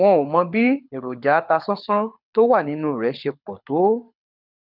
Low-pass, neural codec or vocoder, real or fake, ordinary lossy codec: 5.4 kHz; codec, 24 kHz, 6 kbps, HILCodec; fake; none